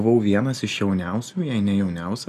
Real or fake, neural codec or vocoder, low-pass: real; none; 14.4 kHz